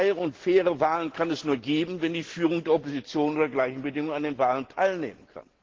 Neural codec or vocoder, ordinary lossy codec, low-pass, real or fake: none; Opus, 16 kbps; 7.2 kHz; real